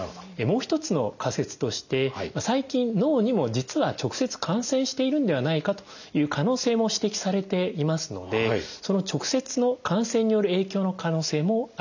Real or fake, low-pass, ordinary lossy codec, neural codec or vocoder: real; 7.2 kHz; none; none